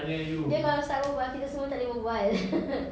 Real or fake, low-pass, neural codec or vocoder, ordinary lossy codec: real; none; none; none